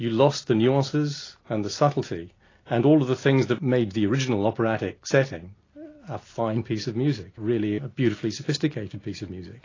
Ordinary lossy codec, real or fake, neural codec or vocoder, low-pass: AAC, 32 kbps; real; none; 7.2 kHz